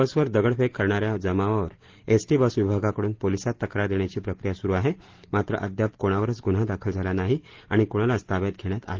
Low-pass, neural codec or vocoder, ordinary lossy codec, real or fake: 7.2 kHz; none; Opus, 16 kbps; real